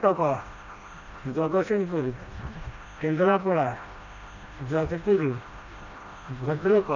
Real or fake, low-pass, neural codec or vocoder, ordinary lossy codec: fake; 7.2 kHz; codec, 16 kHz, 1 kbps, FreqCodec, smaller model; none